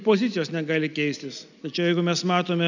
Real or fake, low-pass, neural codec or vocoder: real; 7.2 kHz; none